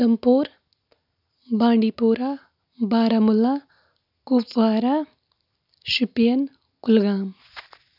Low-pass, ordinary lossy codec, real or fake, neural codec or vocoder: 5.4 kHz; none; real; none